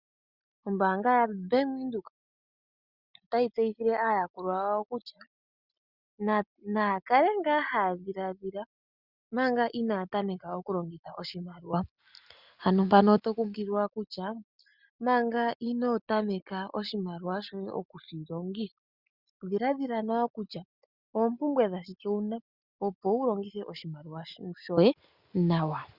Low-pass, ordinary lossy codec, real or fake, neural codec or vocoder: 5.4 kHz; Opus, 64 kbps; real; none